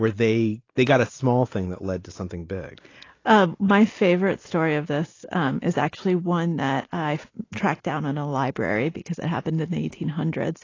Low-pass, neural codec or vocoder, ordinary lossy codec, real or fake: 7.2 kHz; none; AAC, 32 kbps; real